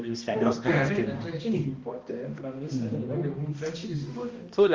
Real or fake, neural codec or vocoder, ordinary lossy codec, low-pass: fake; codec, 16 kHz, 1 kbps, X-Codec, HuBERT features, trained on balanced general audio; Opus, 32 kbps; 7.2 kHz